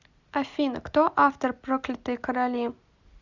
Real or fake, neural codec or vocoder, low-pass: real; none; 7.2 kHz